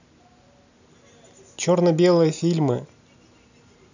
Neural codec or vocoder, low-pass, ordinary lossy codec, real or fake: none; 7.2 kHz; none; real